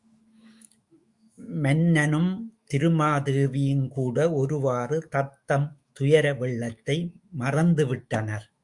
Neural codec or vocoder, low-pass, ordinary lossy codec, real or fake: autoencoder, 48 kHz, 128 numbers a frame, DAC-VAE, trained on Japanese speech; 10.8 kHz; Opus, 64 kbps; fake